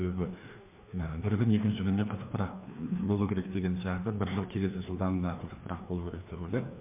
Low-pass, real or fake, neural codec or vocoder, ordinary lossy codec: 3.6 kHz; fake; codec, 16 kHz, 2 kbps, FreqCodec, larger model; MP3, 32 kbps